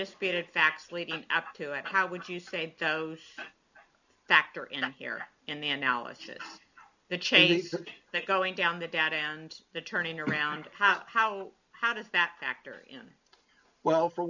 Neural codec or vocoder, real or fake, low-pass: none; real; 7.2 kHz